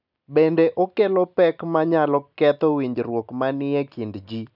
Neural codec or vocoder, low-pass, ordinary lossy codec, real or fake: none; 5.4 kHz; none; real